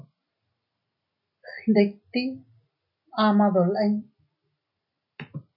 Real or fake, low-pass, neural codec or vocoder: real; 5.4 kHz; none